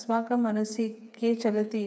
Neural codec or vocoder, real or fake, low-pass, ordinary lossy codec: codec, 16 kHz, 4 kbps, FreqCodec, smaller model; fake; none; none